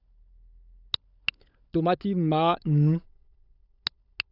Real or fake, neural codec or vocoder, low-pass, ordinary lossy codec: fake; codec, 16 kHz, 16 kbps, FunCodec, trained on LibriTTS, 50 frames a second; 5.4 kHz; none